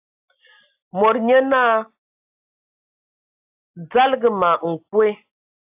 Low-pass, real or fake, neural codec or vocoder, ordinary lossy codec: 3.6 kHz; real; none; AAC, 32 kbps